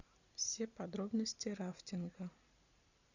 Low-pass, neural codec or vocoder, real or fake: 7.2 kHz; none; real